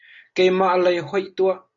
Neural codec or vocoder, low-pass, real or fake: none; 7.2 kHz; real